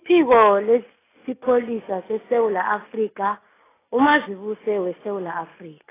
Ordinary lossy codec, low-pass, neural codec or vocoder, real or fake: AAC, 16 kbps; 3.6 kHz; none; real